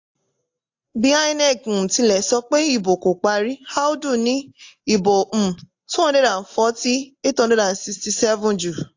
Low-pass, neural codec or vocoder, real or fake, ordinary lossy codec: 7.2 kHz; none; real; AAC, 48 kbps